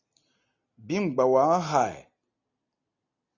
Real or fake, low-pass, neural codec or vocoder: real; 7.2 kHz; none